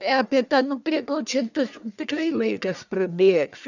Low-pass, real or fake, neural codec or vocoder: 7.2 kHz; fake; codec, 44.1 kHz, 1.7 kbps, Pupu-Codec